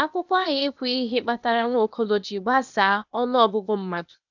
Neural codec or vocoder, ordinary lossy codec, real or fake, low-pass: codec, 16 kHz, 0.8 kbps, ZipCodec; none; fake; 7.2 kHz